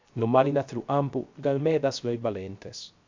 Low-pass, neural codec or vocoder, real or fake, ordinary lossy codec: 7.2 kHz; codec, 16 kHz, 0.3 kbps, FocalCodec; fake; MP3, 64 kbps